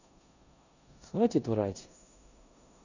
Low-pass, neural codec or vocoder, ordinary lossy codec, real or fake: 7.2 kHz; codec, 16 kHz in and 24 kHz out, 0.9 kbps, LongCat-Audio-Codec, four codebook decoder; none; fake